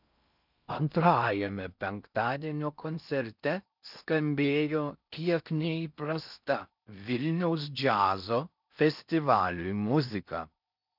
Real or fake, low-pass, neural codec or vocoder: fake; 5.4 kHz; codec, 16 kHz in and 24 kHz out, 0.6 kbps, FocalCodec, streaming, 4096 codes